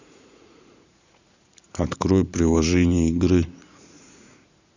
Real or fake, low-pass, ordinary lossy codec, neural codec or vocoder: fake; 7.2 kHz; none; vocoder, 44.1 kHz, 80 mel bands, Vocos